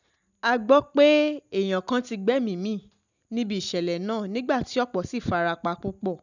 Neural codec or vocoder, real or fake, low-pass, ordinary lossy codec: none; real; 7.2 kHz; none